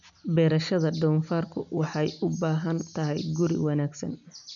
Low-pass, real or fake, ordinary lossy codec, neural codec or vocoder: 7.2 kHz; real; none; none